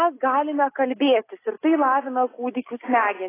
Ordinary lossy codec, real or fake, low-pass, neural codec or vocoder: AAC, 16 kbps; real; 3.6 kHz; none